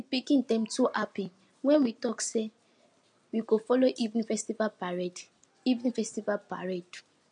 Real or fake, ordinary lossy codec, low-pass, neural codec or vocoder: real; MP3, 48 kbps; 9.9 kHz; none